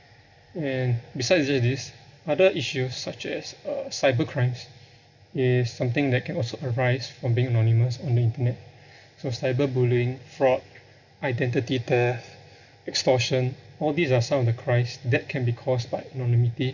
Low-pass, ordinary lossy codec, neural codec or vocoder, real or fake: 7.2 kHz; none; none; real